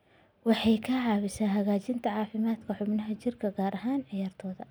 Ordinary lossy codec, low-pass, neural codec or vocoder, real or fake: none; none; none; real